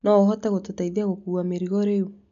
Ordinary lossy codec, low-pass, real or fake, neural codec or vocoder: none; 7.2 kHz; real; none